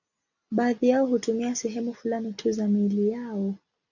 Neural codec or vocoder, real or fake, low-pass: none; real; 7.2 kHz